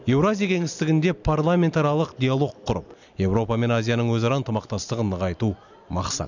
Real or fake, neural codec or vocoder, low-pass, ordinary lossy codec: real; none; 7.2 kHz; none